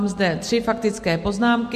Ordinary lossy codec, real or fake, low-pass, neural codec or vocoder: MP3, 64 kbps; real; 14.4 kHz; none